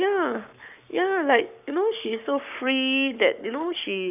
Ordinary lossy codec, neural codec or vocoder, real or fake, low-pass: none; codec, 44.1 kHz, 7.8 kbps, Pupu-Codec; fake; 3.6 kHz